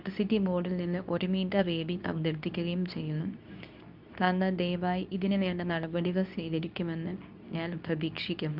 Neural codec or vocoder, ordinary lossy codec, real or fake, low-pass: codec, 24 kHz, 0.9 kbps, WavTokenizer, medium speech release version 2; none; fake; 5.4 kHz